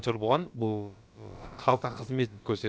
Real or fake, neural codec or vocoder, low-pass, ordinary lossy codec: fake; codec, 16 kHz, about 1 kbps, DyCAST, with the encoder's durations; none; none